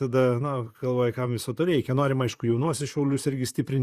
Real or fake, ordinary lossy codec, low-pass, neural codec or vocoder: fake; Opus, 64 kbps; 14.4 kHz; vocoder, 44.1 kHz, 128 mel bands, Pupu-Vocoder